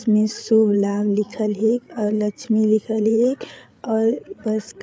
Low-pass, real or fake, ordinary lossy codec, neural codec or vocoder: none; fake; none; codec, 16 kHz, 8 kbps, FreqCodec, larger model